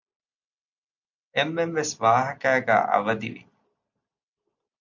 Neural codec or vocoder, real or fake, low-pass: none; real; 7.2 kHz